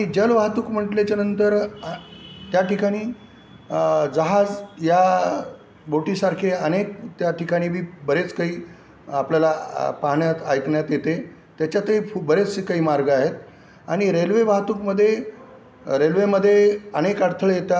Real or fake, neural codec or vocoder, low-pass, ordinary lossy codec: real; none; none; none